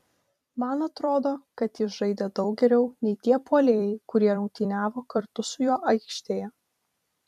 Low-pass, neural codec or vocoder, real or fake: 14.4 kHz; vocoder, 44.1 kHz, 128 mel bands every 256 samples, BigVGAN v2; fake